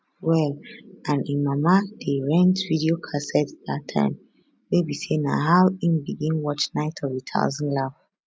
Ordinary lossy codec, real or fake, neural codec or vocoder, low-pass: none; real; none; none